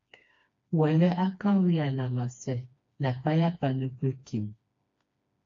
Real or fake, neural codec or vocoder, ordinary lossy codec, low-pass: fake; codec, 16 kHz, 2 kbps, FreqCodec, smaller model; AAC, 48 kbps; 7.2 kHz